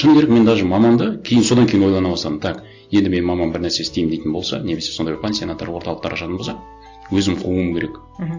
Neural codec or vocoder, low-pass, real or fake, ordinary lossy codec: none; 7.2 kHz; real; AAC, 48 kbps